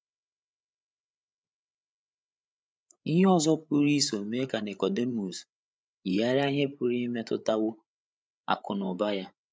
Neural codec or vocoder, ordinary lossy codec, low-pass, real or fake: codec, 16 kHz, 8 kbps, FreqCodec, larger model; none; none; fake